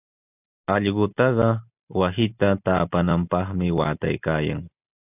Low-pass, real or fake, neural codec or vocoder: 3.6 kHz; real; none